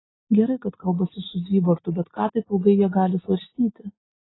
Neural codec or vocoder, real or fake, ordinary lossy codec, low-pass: none; real; AAC, 16 kbps; 7.2 kHz